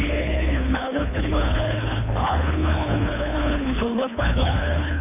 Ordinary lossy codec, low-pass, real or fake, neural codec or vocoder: none; 3.6 kHz; fake; codec, 24 kHz, 3 kbps, HILCodec